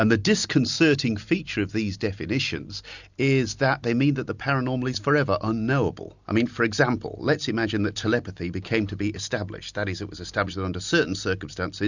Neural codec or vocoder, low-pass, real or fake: none; 7.2 kHz; real